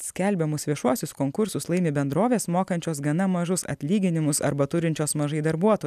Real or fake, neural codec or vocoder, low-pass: real; none; 14.4 kHz